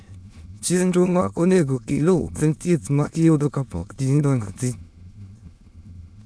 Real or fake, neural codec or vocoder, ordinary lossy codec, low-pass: fake; autoencoder, 22.05 kHz, a latent of 192 numbers a frame, VITS, trained on many speakers; none; none